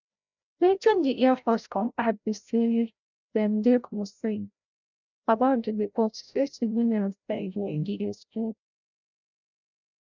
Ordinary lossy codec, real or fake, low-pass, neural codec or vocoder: none; fake; 7.2 kHz; codec, 16 kHz, 0.5 kbps, FreqCodec, larger model